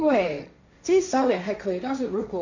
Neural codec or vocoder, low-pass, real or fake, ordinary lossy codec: codec, 16 kHz, 1.1 kbps, Voila-Tokenizer; 7.2 kHz; fake; none